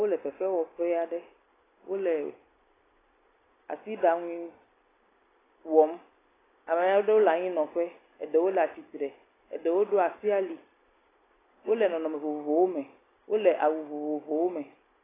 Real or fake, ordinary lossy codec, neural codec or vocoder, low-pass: real; AAC, 16 kbps; none; 3.6 kHz